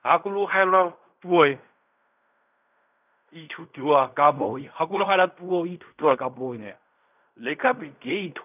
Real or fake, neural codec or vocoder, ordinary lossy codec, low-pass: fake; codec, 16 kHz in and 24 kHz out, 0.4 kbps, LongCat-Audio-Codec, fine tuned four codebook decoder; none; 3.6 kHz